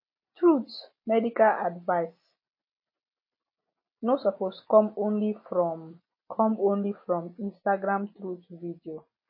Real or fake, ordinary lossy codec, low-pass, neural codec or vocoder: real; MP3, 24 kbps; 5.4 kHz; none